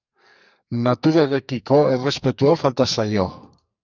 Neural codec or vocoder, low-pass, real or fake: codec, 44.1 kHz, 2.6 kbps, SNAC; 7.2 kHz; fake